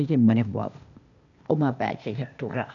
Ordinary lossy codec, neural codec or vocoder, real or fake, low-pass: none; codec, 16 kHz, 0.8 kbps, ZipCodec; fake; 7.2 kHz